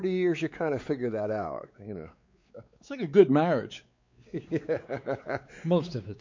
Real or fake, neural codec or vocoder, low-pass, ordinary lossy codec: fake; codec, 16 kHz, 4 kbps, X-Codec, WavLM features, trained on Multilingual LibriSpeech; 7.2 kHz; MP3, 48 kbps